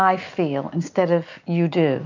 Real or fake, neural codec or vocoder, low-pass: real; none; 7.2 kHz